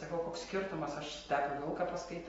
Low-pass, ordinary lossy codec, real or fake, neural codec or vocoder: 19.8 kHz; AAC, 24 kbps; real; none